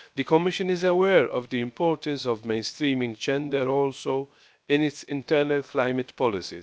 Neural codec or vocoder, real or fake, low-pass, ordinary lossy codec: codec, 16 kHz, 0.7 kbps, FocalCodec; fake; none; none